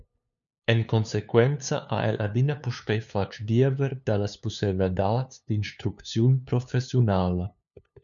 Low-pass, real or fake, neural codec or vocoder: 7.2 kHz; fake; codec, 16 kHz, 2 kbps, FunCodec, trained on LibriTTS, 25 frames a second